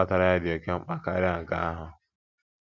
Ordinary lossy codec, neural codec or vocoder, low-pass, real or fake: none; none; 7.2 kHz; real